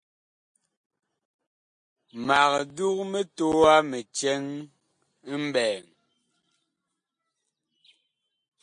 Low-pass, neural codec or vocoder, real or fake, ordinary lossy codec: 9.9 kHz; none; real; MP3, 48 kbps